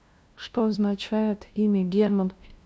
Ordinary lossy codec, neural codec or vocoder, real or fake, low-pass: none; codec, 16 kHz, 0.5 kbps, FunCodec, trained on LibriTTS, 25 frames a second; fake; none